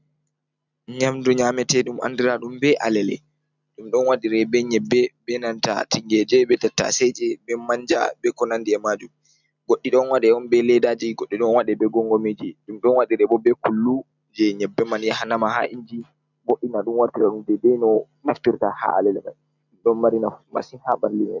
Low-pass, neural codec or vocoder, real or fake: 7.2 kHz; none; real